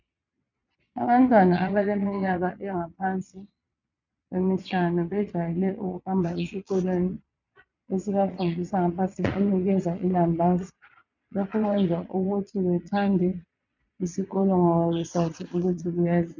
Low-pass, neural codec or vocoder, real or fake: 7.2 kHz; vocoder, 22.05 kHz, 80 mel bands, Vocos; fake